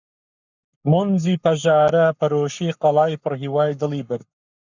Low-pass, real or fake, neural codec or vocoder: 7.2 kHz; fake; codec, 44.1 kHz, 7.8 kbps, Pupu-Codec